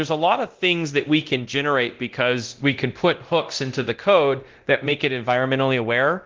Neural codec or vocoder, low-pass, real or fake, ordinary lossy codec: codec, 24 kHz, 0.9 kbps, DualCodec; 7.2 kHz; fake; Opus, 16 kbps